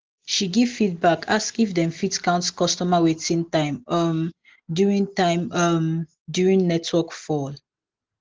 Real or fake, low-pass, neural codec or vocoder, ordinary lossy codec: real; 7.2 kHz; none; Opus, 16 kbps